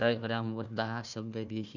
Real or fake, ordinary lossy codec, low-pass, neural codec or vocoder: fake; none; 7.2 kHz; codec, 16 kHz, 1 kbps, FunCodec, trained on Chinese and English, 50 frames a second